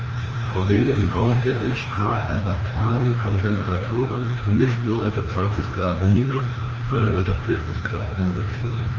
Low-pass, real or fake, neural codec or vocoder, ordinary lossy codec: 7.2 kHz; fake; codec, 16 kHz, 1 kbps, FreqCodec, larger model; Opus, 24 kbps